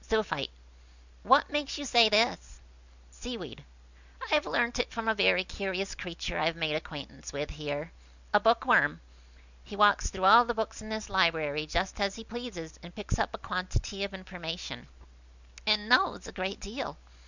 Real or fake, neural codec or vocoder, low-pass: real; none; 7.2 kHz